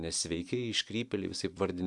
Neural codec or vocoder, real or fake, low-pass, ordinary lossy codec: vocoder, 44.1 kHz, 128 mel bands every 256 samples, BigVGAN v2; fake; 10.8 kHz; MP3, 96 kbps